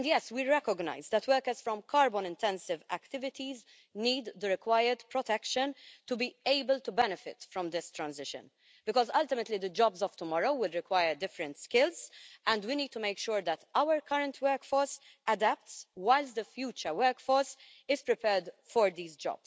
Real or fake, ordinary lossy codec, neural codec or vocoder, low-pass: real; none; none; none